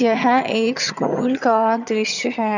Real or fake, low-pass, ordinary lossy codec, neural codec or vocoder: fake; 7.2 kHz; none; vocoder, 22.05 kHz, 80 mel bands, HiFi-GAN